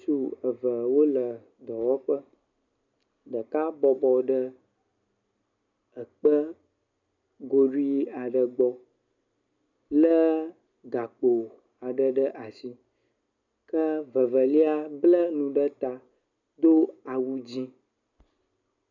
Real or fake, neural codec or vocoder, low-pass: real; none; 7.2 kHz